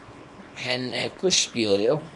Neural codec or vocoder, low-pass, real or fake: codec, 24 kHz, 0.9 kbps, WavTokenizer, small release; 10.8 kHz; fake